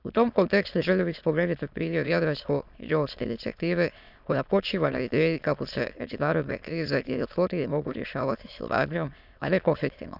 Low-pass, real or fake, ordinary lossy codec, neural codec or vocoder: 5.4 kHz; fake; none; autoencoder, 22.05 kHz, a latent of 192 numbers a frame, VITS, trained on many speakers